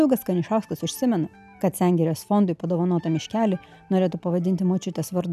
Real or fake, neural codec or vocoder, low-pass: real; none; 14.4 kHz